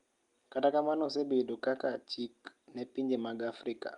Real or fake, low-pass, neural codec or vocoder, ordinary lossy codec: real; 10.8 kHz; none; Opus, 32 kbps